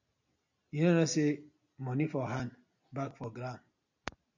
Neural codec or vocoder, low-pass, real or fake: none; 7.2 kHz; real